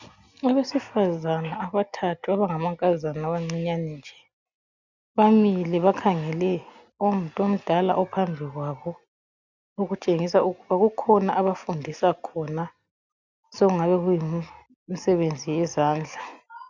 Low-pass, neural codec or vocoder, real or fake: 7.2 kHz; none; real